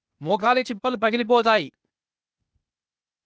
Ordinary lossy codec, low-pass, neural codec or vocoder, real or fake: none; none; codec, 16 kHz, 0.8 kbps, ZipCodec; fake